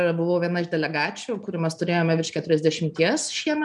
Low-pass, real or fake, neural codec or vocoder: 10.8 kHz; real; none